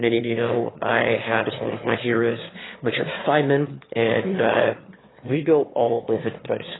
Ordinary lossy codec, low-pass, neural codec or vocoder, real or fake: AAC, 16 kbps; 7.2 kHz; autoencoder, 22.05 kHz, a latent of 192 numbers a frame, VITS, trained on one speaker; fake